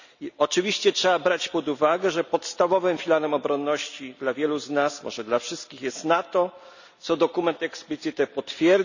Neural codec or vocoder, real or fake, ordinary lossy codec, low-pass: none; real; none; 7.2 kHz